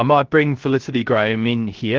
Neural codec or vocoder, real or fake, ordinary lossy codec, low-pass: codec, 16 kHz, 0.3 kbps, FocalCodec; fake; Opus, 16 kbps; 7.2 kHz